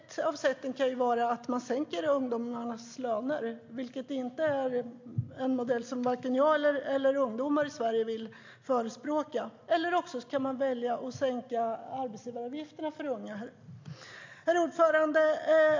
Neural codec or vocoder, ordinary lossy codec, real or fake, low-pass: none; MP3, 48 kbps; real; 7.2 kHz